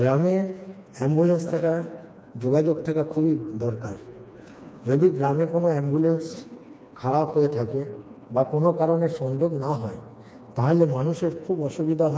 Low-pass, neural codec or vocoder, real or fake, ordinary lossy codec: none; codec, 16 kHz, 2 kbps, FreqCodec, smaller model; fake; none